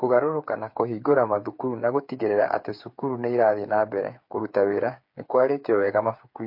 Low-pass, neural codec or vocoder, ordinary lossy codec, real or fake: 5.4 kHz; codec, 16 kHz, 8 kbps, FreqCodec, smaller model; MP3, 32 kbps; fake